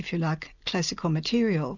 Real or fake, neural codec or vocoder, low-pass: real; none; 7.2 kHz